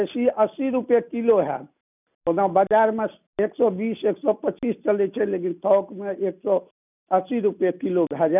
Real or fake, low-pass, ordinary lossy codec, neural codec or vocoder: real; 3.6 kHz; none; none